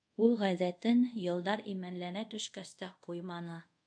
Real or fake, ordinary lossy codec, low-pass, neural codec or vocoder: fake; MP3, 64 kbps; 9.9 kHz; codec, 24 kHz, 0.5 kbps, DualCodec